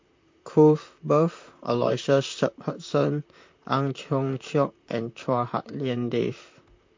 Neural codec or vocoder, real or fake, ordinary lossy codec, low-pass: vocoder, 44.1 kHz, 128 mel bands, Pupu-Vocoder; fake; MP3, 48 kbps; 7.2 kHz